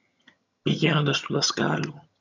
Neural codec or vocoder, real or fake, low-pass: vocoder, 22.05 kHz, 80 mel bands, HiFi-GAN; fake; 7.2 kHz